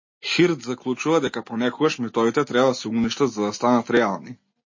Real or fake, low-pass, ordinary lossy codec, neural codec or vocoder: real; 7.2 kHz; MP3, 32 kbps; none